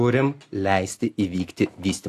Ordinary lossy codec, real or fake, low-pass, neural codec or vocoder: AAC, 64 kbps; real; 14.4 kHz; none